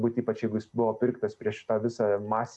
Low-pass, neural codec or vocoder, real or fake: 9.9 kHz; none; real